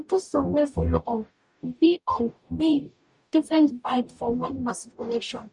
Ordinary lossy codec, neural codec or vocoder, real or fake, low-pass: MP3, 96 kbps; codec, 44.1 kHz, 0.9 kbps, DAC; fake; 10.8 kHz